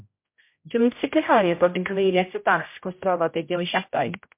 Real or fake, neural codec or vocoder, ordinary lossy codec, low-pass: fake; codec, 16 kHz, 0.5 kbps, X-Codec, HuBERT features, trained on general audio; MP3, 32 kbps; 3.6 kHz